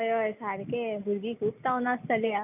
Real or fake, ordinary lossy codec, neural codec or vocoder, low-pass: real; none; none; 3.6 kHz